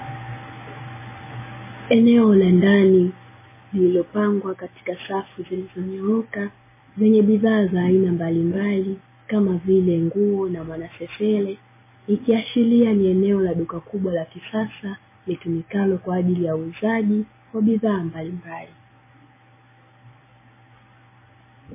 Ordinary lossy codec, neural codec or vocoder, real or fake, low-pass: MP3, 16 kbps; none; real; 3.6 kHz